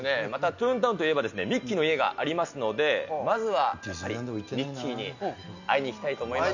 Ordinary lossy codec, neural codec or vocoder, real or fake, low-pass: none; none; real; 7.2 kHz